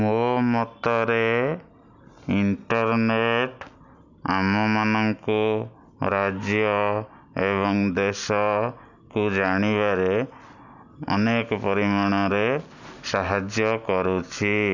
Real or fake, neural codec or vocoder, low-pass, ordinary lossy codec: real; none; 7.2 kHz; none